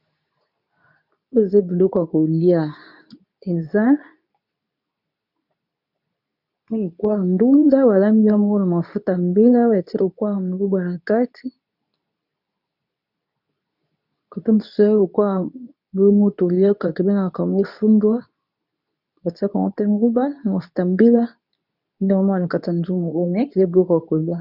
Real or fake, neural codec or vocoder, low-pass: fake; codec, 24 kHz, 0.9 kbps, WavTokenizer, medium speech release version 2; 5.4 kHz